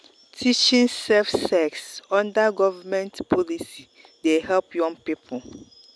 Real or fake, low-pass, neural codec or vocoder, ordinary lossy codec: real; none; none; none